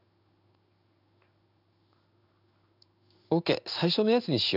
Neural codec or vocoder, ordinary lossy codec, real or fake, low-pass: codec, 16 kHz in and 24 kHz out, 1 kbps, XY-Tokenizer; none; fake; 5.4 kHz